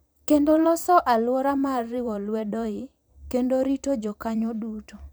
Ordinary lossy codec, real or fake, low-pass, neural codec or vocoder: none; fake; none; vocoder, 44.1 kHz, 128 mel bands every 512 samples, BigVGAN v2